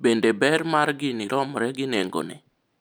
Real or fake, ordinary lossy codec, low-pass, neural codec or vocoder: real; none; none; none